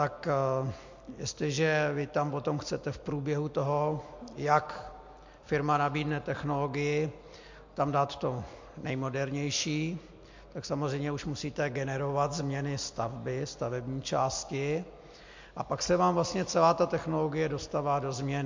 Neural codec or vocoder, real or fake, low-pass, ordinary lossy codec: none; real; 7.2 kHz; MP3, 48 kbps